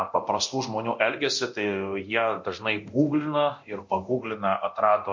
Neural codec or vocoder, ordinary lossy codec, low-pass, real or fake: codec, 24 kHz, 0.9 kbps, DualCodec; MP3, 48 kbps; 7.2 kHz; fake